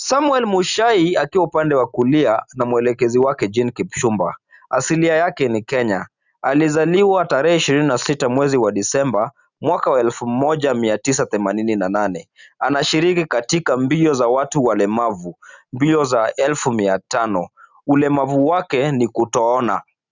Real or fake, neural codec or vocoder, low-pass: real; none; 7.2 kHz